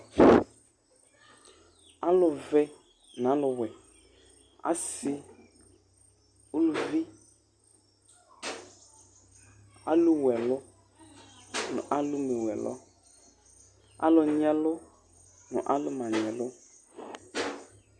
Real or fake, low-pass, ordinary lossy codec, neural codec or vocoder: real; 9.9 kHz; Opus, 64 kbps; none